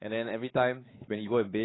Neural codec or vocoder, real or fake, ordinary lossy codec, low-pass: codec, 16 kHz, 4 kbps, X-Codec, WavLM features, trained on Multilingual LibriSpeech; fake; AAC, 16 kbps; 7.2 kHz